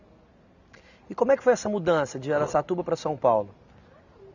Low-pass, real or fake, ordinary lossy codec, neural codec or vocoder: 7.2 kHz; real; none; none